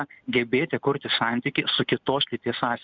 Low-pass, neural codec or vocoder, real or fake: 7.2 kHz; none; real